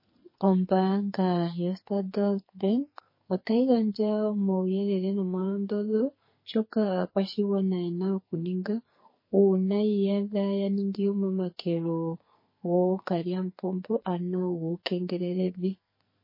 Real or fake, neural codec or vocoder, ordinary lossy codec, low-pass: fake; codec, 44.1 kHz, 2.6 kbps, SNAC; MP3, 24 kbps; 5.4 kHz